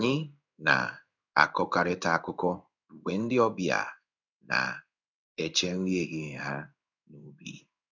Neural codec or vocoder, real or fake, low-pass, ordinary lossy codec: codec, 16 kHz in and 24 kHz out, 1 kbps, XY-Tokenizer; fake; 7.2 kHz; none